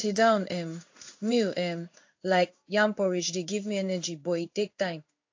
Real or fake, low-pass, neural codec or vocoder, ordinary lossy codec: fake; 7.2 kHz; codec, 16 kHz in and 24 kHz out, 1 kbps, XY-Tokenizer; AAC, 48 kbps